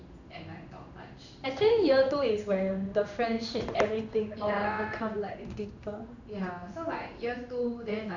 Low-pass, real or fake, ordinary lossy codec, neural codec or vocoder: 7.2 kHz; fake; none; codec, 16 kHz in and 24 kHz out, 1 kbps, XY-Tokenizer